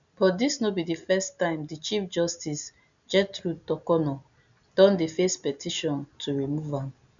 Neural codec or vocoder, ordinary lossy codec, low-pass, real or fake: none; none; 7.2 kHz; real